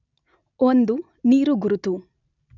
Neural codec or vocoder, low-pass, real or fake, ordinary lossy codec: none; 7.2 kHz; real; none